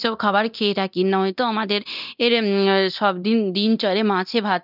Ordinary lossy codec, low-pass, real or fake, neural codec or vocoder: none; 5.4 kHz; fake; codec, 24 kHz, 0.9 kbps, DualCodec